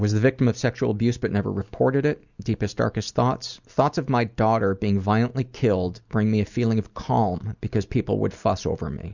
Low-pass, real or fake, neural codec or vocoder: 7.2 kHz; real; none